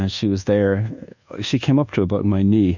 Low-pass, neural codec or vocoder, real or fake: 7.2 kHz; codec, 24 kHz, 1.2 kbps, DualCodec; fake